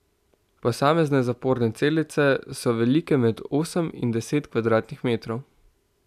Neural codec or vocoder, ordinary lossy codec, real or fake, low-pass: none; none; real; 14.4 kHz